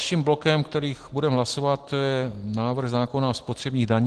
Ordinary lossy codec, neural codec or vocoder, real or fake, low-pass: Opus, 16 kbps; none; real; 10.8 kHz